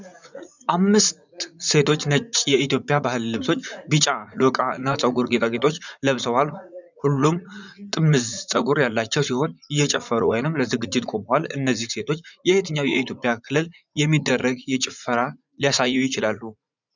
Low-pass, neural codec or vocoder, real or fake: 7.2 kHz; vocoder, 44.1 kHz, 80 mel bands, Vocos; fake